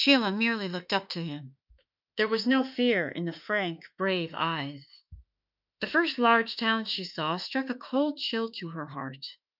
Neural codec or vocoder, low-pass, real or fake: autoencoder, 48 kHz, 32 numbers a frame, DAC-VAE, trained on Japanese speech; 5.4 kHz; fake